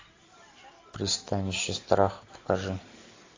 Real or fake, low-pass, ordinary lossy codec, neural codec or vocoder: real; 7.2 kHz; AAC, 32 kbps; none